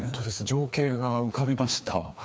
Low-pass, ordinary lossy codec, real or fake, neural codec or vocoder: none; none; fake; codec, 16 kHz, 2 kbps, FreqCodec, larger model